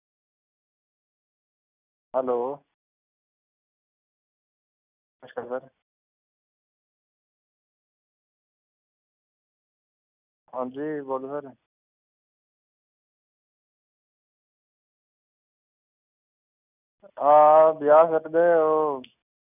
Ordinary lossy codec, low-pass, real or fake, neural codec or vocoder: none; 3.6 kHz; real; none